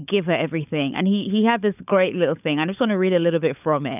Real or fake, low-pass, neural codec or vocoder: fake; 3.6 kHz; codec, 16 kHz, 16 kbps, FunCodec, trained on LibriTTS, 50 frames a second